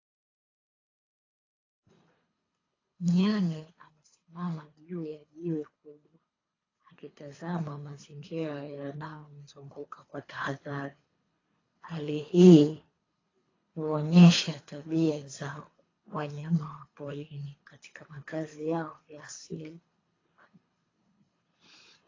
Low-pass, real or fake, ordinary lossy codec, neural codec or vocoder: 7.2 kHz; fake; AAC, 32 kbps; codec, 24 kHz, 3 kbps, HILCodec